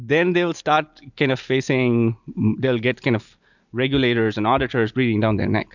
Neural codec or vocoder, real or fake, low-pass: none; real; 7.2 kHz